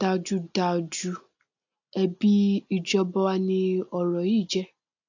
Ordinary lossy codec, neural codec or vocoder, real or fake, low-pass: AAC, 48 kbps; none; real; 7.2 kHz